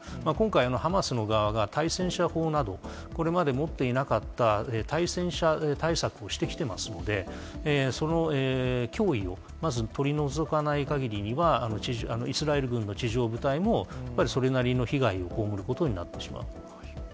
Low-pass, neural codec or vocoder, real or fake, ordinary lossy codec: none; none; real; none